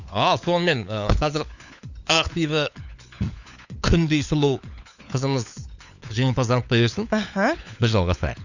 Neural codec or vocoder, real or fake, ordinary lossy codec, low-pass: codec, 16 kHz, 4 kbps, FunCodec, trained on LibriTTS, 50 frames a second; fake; none; 7.2 kHz